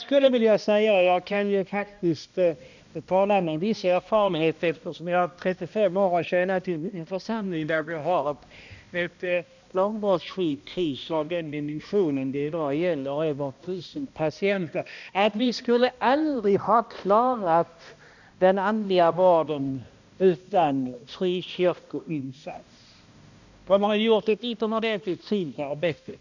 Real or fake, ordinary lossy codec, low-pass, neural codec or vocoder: fake; none; 7.2 kHz; codec, 16 kHz, 1 kbps, X-Codec, HuBERT features, trained on balanced general audio